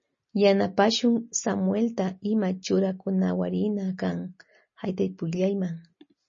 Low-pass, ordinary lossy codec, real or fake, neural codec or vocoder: 7.2 kHz; MP3, 32 kbps; real; none